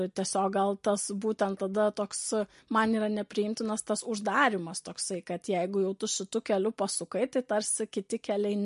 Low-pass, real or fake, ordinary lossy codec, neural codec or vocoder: 14.4 kHz; real; MP3, 48 kbps; none